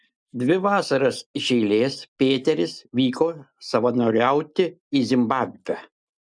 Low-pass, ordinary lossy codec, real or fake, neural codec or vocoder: 9.9 kHz; MP3, 96 kbps; real; none